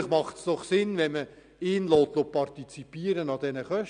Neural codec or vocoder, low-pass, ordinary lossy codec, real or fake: none; 9.9 kHz; none; real